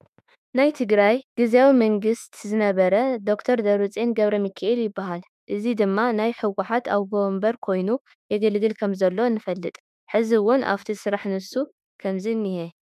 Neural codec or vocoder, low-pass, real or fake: autoencoder, 48 kHz, 32 numbers a frame, DAC-VAE, trained on Japanese speech; 14.4 kHz; fake